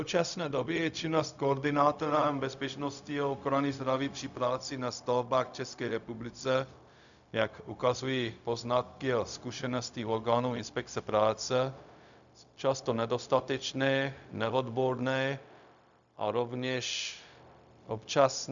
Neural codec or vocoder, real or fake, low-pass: codec, 16 kHz, 0.4 kbps, LongCat-Audio-Codec; fake; 7.2 kHz